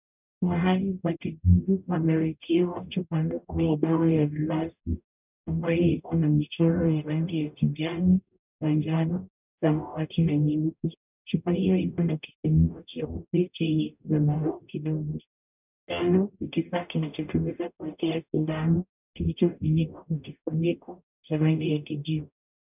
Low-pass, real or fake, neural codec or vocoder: 3.6 kHz; fake; codec, 44.1 kHz, 0.9 kbps, DAC